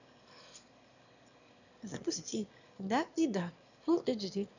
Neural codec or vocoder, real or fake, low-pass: autoencoder, 22.05 kHz, a latent of 192 numbers a frame, VITS, trained on one speaker; fake; 7.2 kHz